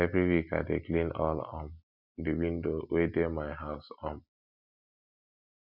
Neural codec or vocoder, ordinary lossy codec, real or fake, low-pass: none; none; real; 5.4 kHz